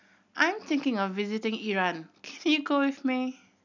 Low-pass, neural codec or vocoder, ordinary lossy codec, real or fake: 7.2 kHz; none; none; real